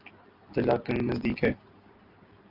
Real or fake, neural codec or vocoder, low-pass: real; none; 5.4 kHz